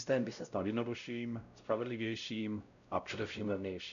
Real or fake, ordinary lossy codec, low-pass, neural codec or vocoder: fake; MP3, 96 kbps; 7.2 kHz; codec, 16 kHz, 0.5 kbps, X-Codec, WavLM features, trained on Multilingual LibriSpeech